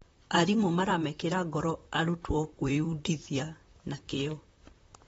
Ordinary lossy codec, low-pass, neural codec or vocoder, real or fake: AAC, 24 kbps; 19.8 kHz; vocoder, 44.1 kHz, 128 mel bands, Pupu-Vocoder; fake